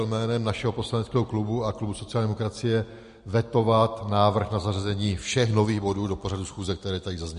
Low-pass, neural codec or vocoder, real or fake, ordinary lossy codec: 10.8 kHz; none; real; MP3, 48 kbps